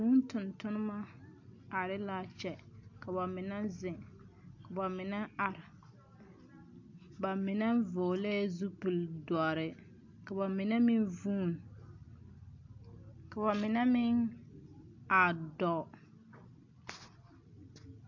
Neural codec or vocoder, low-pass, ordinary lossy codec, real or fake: none; 7.2 kHz; AAC, 48 kbps; real